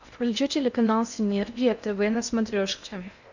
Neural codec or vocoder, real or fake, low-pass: codec, 16 kHz in and 24 kHz out, 0.6 kbps, FocalCodec, streaming, 2048 codes; fake; 7.2 kHz